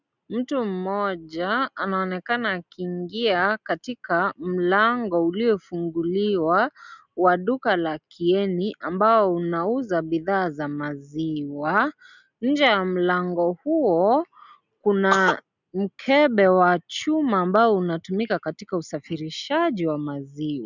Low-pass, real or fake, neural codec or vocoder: 7.2 kHz; real; none